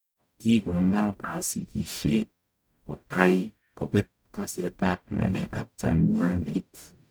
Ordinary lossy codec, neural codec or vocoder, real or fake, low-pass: none; codec, 44.1 kHz, 0.9 kbps, DAC; fake; none